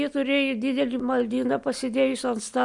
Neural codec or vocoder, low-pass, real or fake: none; 10.8 kHz; real